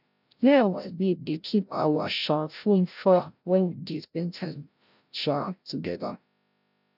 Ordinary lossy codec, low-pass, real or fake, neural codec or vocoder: none; 5.4 kHz; fake; codec, 16 kHz, 0.5 kbps, FreqCodec, larger model